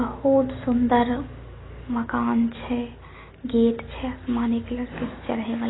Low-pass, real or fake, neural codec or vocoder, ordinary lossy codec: 7.2 kHz; real; none; AAC, 16 kbps